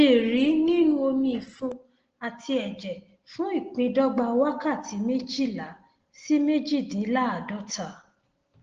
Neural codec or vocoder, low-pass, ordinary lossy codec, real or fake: none; 7.2 kHz; Opus, 16 kbps; real